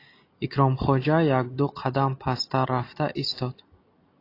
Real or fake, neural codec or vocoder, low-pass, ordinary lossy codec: real; none; 5.4 kHz; AAC, 32 kbps